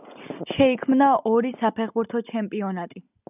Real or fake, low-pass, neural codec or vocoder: real; 3.6 kHz; none